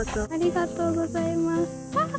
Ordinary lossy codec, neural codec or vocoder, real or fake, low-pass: none; none; real; none